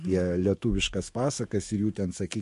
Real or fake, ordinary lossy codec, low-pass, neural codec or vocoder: fake; MP3, 48 kbps; 14.4 kHz; autoencoder, 48 kHz, 128 numbers a frame, DAC-VAE, trained on Japanese speech